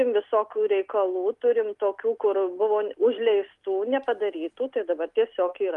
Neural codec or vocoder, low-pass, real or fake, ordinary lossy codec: none; 10.8 kHz; real; Opus, 32 kbps